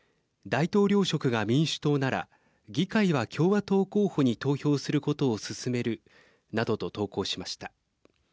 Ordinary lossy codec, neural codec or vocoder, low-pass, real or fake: none; none; none; real